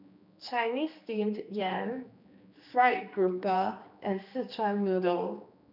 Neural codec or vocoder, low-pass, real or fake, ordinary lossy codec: codec, 16 kHz, 2 kbps, X-Codec, HuBERT features, trained on general audio; 5.4 kHz; fake; none